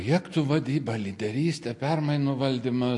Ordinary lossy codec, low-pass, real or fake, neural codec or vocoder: MP3, 48 kbps; 10.8 kHz; real; none